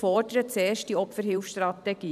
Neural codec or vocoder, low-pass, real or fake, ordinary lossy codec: vocoder, 44.1 kHz, 128 mel bands every 512 samples, BigVGAN v2; 14.4 kHz; fake; none